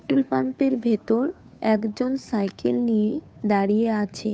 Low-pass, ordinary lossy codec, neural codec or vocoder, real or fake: none; none; codec, 16 kHz, 2 kbps, FunCodec, trained on Chinese and English, 25 frames a second; fake